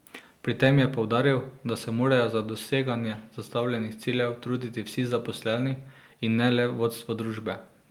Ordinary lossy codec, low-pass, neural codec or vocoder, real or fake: Opus, 32 kbps; 19.8 kHz; none; real